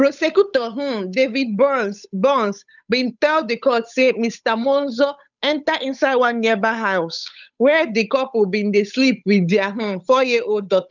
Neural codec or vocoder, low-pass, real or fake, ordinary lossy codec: codec, 16 kHz, 8 kbps, FunCodec, trained on Chinese and English, 25 frames a second; 7.2 kHz; fake; none